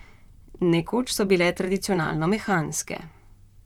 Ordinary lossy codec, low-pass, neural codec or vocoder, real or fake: none; 19.8 kHz; vocoder, 44.1 kHz, 128 mel bands, Pupu-Vocoder; fake